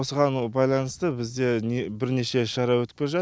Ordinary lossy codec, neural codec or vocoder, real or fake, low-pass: none; none; real; none